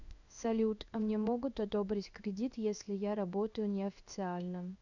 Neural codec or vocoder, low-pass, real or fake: codec, 16 kHz in and 24 kHz out, 1 kbps, XY-Tokenizer; 7.2 kHz; fake